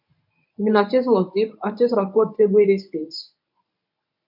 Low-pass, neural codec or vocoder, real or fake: 5.4 kHz; codec, 24 kHz, 0.9 kbps, WavTokenizer, medium speech release version 1; fake